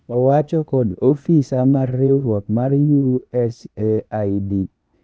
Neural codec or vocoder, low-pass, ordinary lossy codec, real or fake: codec, 16 kHz, 0.8 kbps, ZipCodec; none; none; fake